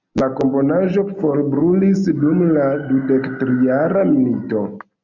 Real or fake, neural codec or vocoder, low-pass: real; none; 7.2 kHz